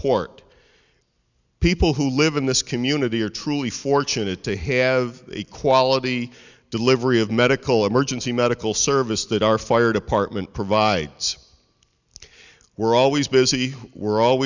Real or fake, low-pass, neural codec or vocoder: real; 7.2 kHz; none